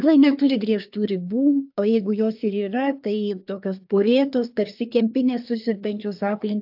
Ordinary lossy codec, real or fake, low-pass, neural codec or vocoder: AAC, 48 kbps; fake; 5.4 kHz; codec, 24 kHz, 1 kbps, SNAC